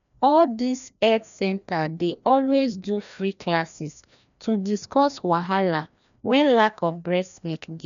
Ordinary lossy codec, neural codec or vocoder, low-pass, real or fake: none; codec, 16 kHz, 1 kbps, FreqCodec, larger model; 7.2 kHz; fake